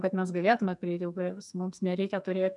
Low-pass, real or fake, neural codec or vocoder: 10.8 kHz; fake; codec, 44.1 kHz, 2.6 kbps, SNAC